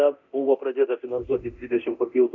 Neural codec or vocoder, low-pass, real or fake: codec, 24 kHz, 0.9 kbps, DualCodec; 7.2 kHz; fake